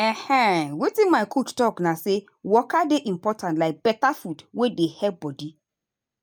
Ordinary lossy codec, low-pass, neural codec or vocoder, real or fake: none; none; none; real